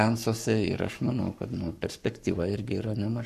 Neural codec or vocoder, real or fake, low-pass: codec, 44.1 kHz, 7.8 kbps, Pupu-Codec; fake; 14.4 kHz